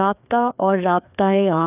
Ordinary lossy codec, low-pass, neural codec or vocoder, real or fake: none; 3.6 kHz; codec, 16 kHz, 2 kbps, FreqCodec, larger model; fake